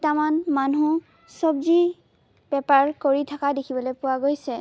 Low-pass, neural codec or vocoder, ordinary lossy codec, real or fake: none; none; none; real